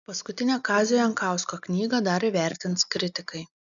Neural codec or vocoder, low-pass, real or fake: none; 7.2 kHz; real